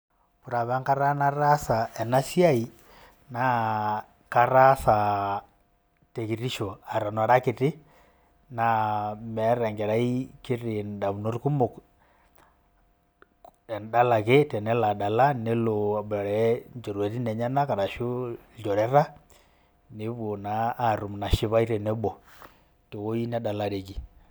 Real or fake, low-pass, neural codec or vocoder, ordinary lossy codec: real; none; none; none